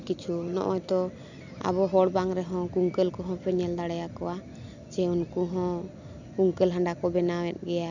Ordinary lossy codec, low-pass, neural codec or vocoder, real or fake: none; 7.2 kHz; none; real